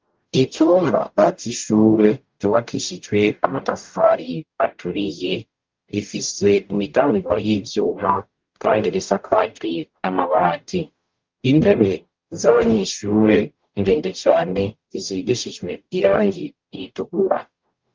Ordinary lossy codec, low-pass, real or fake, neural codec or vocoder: Opus, 32 kbps; 7.2 kHz; fake; codec, 44.1 kHz, 0.9 kbps, DAC